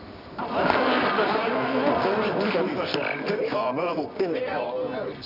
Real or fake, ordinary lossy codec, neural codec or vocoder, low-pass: fake; none; codec, 24 kHz, 0.9 kbps, WavTokenizer, medium music audio release; 5.4 kHz